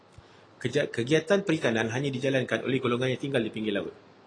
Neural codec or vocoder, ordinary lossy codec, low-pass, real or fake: none; AAC, 32 kbps; 9.9 kHz; real